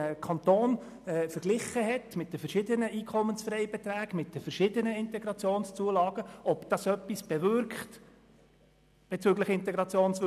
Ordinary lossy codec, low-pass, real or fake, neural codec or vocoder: none; 14.4 kHz; real; none